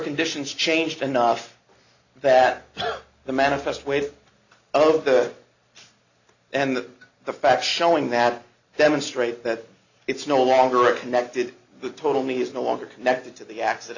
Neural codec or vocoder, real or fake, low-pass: none; real; 7.2 kHz